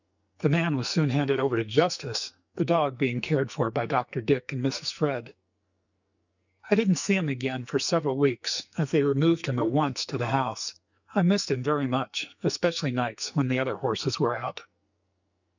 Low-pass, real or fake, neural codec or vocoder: 7.2 kHz; fake; codec, 44.1 kHz, 2.6 kbps, SNAC